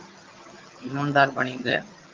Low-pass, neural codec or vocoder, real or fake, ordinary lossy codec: 7.2 kHz; vocoder, 22.05 kHz, 80 mel bands, HiFi-GAN; fake; Opus, 32 kbps